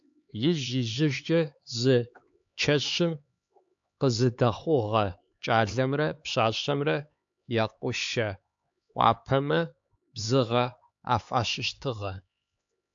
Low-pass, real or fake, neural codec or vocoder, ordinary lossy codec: 7.2 kHz; fake; codec, 16 kHz, 4 kbps, X-Codec, HuBERT features, trained on LibriSpeech; AAC, 64 kbps